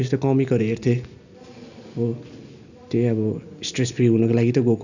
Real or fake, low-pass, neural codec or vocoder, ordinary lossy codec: real; 7.2 kHz; none; none